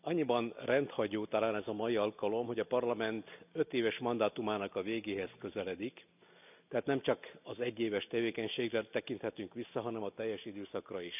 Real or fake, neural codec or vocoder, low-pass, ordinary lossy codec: real; none; 3.6 kHz; none